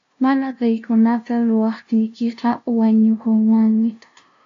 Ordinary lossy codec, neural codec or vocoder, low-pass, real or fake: AAC, 48 kbps; codec, 16 kHz, 0.5 kbps, FunCodec, trained on LibriTTS, 25 frames a second; 7.2 kHz; fake